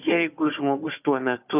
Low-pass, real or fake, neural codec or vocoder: 3.6 kHz; fake; codec, 16 kHz, 4 kbps, FunCodec, trained on Chinese and English, 50 frames a second